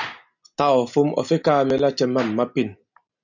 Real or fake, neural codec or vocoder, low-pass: real; none; 7.2 kHz